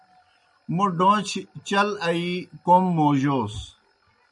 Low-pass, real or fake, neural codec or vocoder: 10.8 kHz; real; none